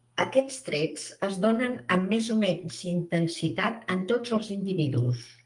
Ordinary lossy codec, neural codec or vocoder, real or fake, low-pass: Opus, 32 kbps; codec, 44.1 kHz, 2.6 kbps, SNAC; fake; 10.8 kHz